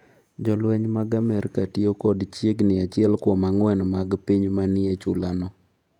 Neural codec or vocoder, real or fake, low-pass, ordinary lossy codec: vocoder, 48 kHz, 128 mel bands, Vocos; fake; 19.8 kHz; none